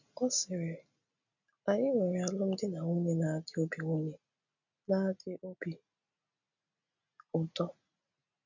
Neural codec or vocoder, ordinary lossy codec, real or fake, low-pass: none; none; real; 7.2 kHz